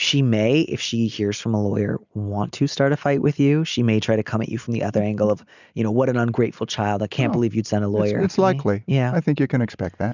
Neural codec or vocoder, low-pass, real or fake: none; 7.2 kHz; real